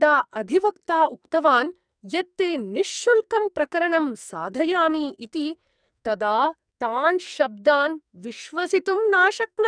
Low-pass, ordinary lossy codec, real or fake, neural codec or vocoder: 9.9 kHz; none; fake; codec, 44.1 kHz, 2.6 kbps, SNAC